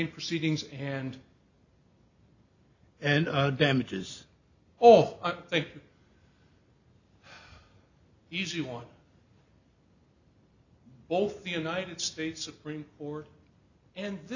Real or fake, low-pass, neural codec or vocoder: real; 7.2 kHz; none